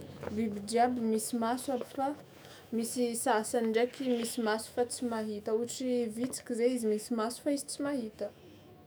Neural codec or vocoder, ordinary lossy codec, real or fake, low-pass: autoencoder, 48 kHz, 128 numbers a frame, DAC-VAE, trained on Japanese speech; none; fake; none